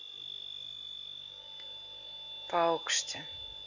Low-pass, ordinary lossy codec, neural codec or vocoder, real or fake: 7.2 kHz; none; none; real